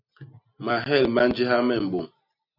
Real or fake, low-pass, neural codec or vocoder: real; 5.4 kHz; none